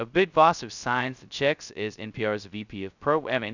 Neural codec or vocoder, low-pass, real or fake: codec, 16 kHz, 0.2 kbps, FocalCodec; 7.2 kHz; fake